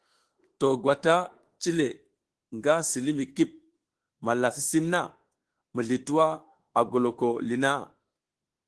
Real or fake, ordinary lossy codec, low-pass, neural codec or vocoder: fake; Opus, 16 kbps; 10.8 kHz; autoencoder, 48 kHz, 32 numbers a frame, DAC-VAE, trained on Japanese speech